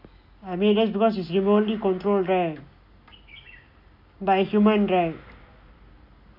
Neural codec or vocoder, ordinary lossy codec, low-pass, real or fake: none; none; 5.4 kHz; real